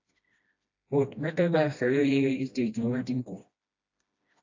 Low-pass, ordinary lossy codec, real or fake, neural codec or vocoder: 7.2 kHz; AAC, 48 kbps; fake; codec, 16 kHz, 1 kbps, FreqCodec, smaller model